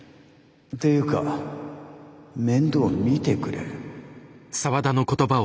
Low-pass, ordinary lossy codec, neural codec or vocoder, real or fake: none; none; none; real